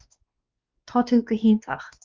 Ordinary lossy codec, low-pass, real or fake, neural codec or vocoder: Opus, 32 kbps; 7.2 kHz; fake; codec, 16 kHz, 2 kbps, X-Codec, WavLM features, trained on Multilingual LibriSpeech